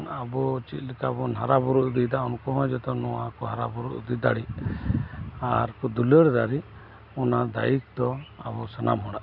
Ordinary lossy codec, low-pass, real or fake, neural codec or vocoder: none; 5.4 kHz; real; none